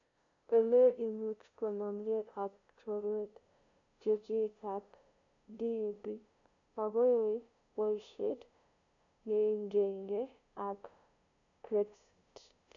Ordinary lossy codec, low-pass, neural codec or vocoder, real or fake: none; 7.2 kHz; codec, 16 kHz, 0.5 kbps, FunCodec, trained on LibriTTS, 25 frames a second; fake